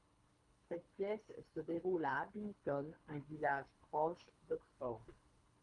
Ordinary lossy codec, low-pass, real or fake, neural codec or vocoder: Opus, 32 kbps; 10.8 kHz; fake; codec, 24 kHz, 3 kbps, HILCodec